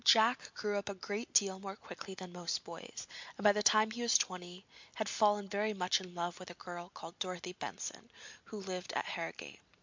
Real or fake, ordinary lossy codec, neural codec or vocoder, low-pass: real; MP3, 64 kbps; none; 7.2 kHz